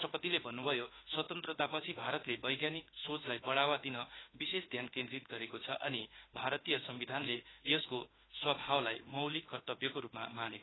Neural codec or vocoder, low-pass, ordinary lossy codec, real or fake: autoencoder, 48 kHz, 32 numbers a frame, DAC-VAE, trained on Japanese speech; 7.2 kHz; AAC, 16 kbps; fake